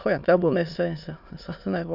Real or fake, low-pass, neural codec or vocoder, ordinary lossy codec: fake; 5.4 kHz; autoencoder, 22.05 kHz, a latent of 192 numbers a frame, VITS, trained on many speakers; none